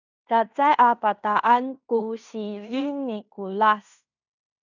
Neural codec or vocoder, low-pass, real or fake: codec, 16 kHz in and 24 kHz out, 0.9 kbps, LongCat-Audio-Codec, fine tuned four codebook decoder; 7.2 kHz; fake